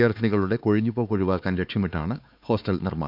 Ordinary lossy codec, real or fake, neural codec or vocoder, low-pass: none; fake; codec, 16 kHz, 4 kbps, X-Codec, HuBERT features, trained on LibriSpeech; 5.4 kHz